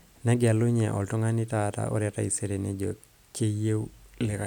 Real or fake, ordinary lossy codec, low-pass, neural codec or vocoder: real; none; 19.8 kHz; none